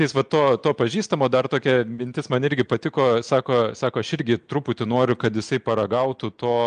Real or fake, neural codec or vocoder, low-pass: real; none; 9.9 kHz